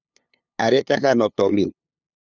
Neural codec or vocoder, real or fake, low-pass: codec, 16 kHz, 2 kbps, FunCodec, trained on LibriTTS, 25 frames a second; fake; 7.2 kHz